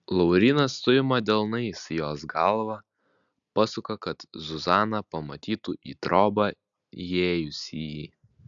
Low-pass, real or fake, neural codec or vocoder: 7.2 kHz; real; none